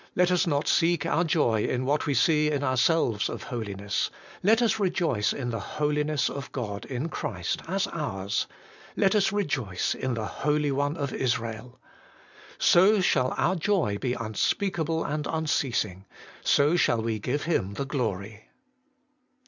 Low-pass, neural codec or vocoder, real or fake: 7.2 kHz; none; real